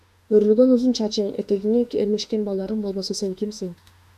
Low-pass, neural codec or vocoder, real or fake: 14.4 kHz; autoencoder, 48 kHz, 32 numbers a frame, DAC-VAE, trained on Japanese speech; fake